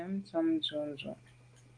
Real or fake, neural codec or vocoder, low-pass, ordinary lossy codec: real; none; 9.9 kHz; Opus, 24 kbps